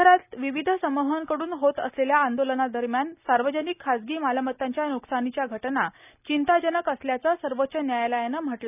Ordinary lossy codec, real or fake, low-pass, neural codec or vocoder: none; real; 3.6 kHz; none